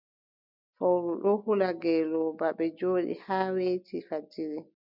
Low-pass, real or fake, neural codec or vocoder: 5.4 kHz; real; none